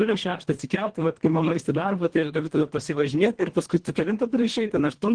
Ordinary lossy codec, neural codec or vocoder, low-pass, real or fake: Opus, 16 kbps; codec, 24 kHz, 1.5 kbps, HILCodec; 9.9 kHz; fake